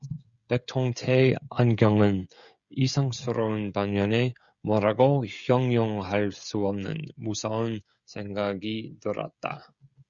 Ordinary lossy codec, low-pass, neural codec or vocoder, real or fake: Opus, 64 kbps; 7.2 kHz; codec, 16 kHz, 16 kbps, FreqCodec, smaller model; fake